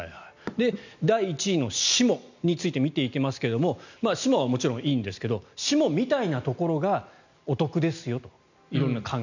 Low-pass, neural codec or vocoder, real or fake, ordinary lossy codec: 7.2 kHz; none; real; none